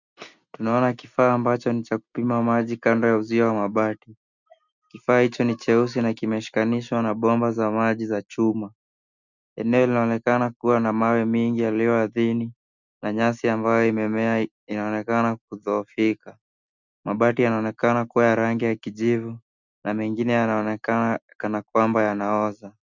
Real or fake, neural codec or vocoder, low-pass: real; none; 7.2 kHz